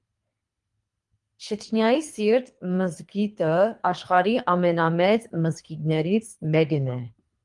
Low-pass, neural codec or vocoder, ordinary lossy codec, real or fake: 10.8 kHz; codec, 24 kHz, 3 kbps, HILCodec; Opus, 32 kbps; fake